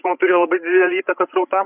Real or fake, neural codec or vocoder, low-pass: fake; codec, 16 kHz, 8 kbps, FreqCodec, larger model; 3.6 kHz